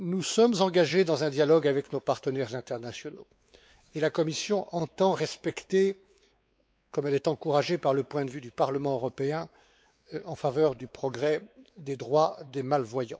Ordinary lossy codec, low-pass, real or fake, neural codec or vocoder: none; none; fake; codec, 16 kHz, 4 kbps, X-Codec, WavLM features, trained on Multilingual LibriSpeech